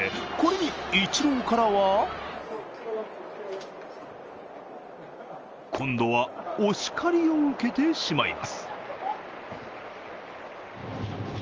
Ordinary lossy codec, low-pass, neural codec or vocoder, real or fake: Opus, 24 kbps; 7.2 kHz; none; real